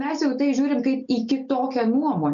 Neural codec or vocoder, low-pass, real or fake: none; 7.2 kHz; real